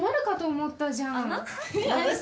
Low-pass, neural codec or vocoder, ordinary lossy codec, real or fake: none; none; none; real